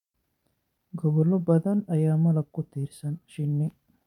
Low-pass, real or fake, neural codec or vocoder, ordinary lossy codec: 19.8 kHz; fake; vocoder, 44.1 kHz, 128 mel bands every 512 samples, BigVGAN v2; none